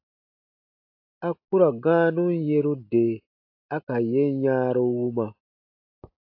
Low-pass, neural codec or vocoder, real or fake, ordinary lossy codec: 5.4 kHz; none; real; AAC, 32 kbps